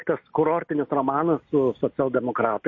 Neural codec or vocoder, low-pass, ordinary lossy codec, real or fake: none; 7.2 kHz; MP3, 32 kbps; real